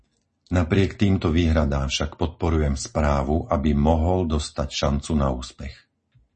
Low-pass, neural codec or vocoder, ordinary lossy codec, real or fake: 10.8 kHz; none; MP3, 32 kbps; real